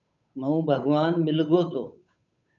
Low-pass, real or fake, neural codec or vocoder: 7.2 kHz; fake; codec, 16 kHz, 8 kbps, FunCodec, trained on Chinese and English, 25 frames a second